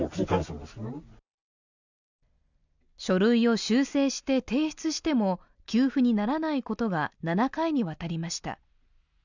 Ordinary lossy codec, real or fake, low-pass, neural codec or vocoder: none; real; 7.2 kHz; none